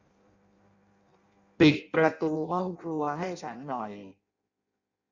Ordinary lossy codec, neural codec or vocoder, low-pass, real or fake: Opus, 64 kbps; codec, 16 kHz in and 24 kHz out, 0.6 kbps, FireRedTTS-2 codec; 7.2 kHz; fake